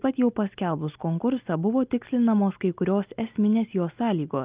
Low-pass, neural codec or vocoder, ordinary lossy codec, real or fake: 3.6 kHz; none; Opus, 24 kbps; real